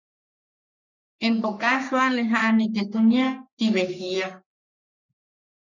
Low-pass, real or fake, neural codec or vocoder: 7.2 kHz; fake; codec, 44.1 kHz, 3.4 kbps, Pupu-Codec